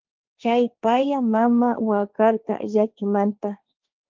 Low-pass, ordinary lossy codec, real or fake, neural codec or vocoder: 7.2 kHz; Opus, 24 kbps; fake; codec, 16 kHz, 1.1 kbps, Voila-Tokenizer